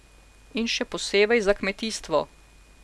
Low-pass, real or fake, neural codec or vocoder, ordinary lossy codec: none; real; none; none